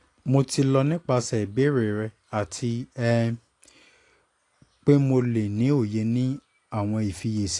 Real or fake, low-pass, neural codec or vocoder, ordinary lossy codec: real; 10.8 kHz; none; AAC, 48 kbps